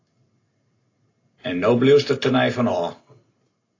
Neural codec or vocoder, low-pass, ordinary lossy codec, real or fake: none; 7.2 kHz; AAC, 32 kbps; real